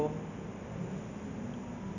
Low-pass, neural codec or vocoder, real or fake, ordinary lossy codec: 7.2 kHz; none; real; none